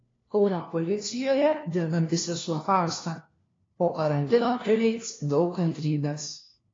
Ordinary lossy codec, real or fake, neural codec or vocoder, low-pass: AAC, 32 kbps; fake; codec, 16 kHz, 1 kbps, FunCodec, trained on LibriTTS, 50 frames a second; 7.2 kHz